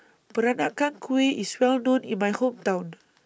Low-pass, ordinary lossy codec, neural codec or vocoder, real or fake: none; none; none; real